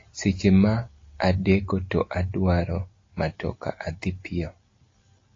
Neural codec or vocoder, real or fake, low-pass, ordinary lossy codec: none; real; 7.2 kHz; AAC, 32 kbps